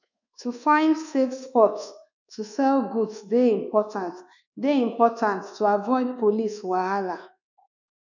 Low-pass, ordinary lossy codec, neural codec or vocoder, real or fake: 7.2 kHz; none; codec, 24 kHz, 1.2 kbps, DualCodec; fake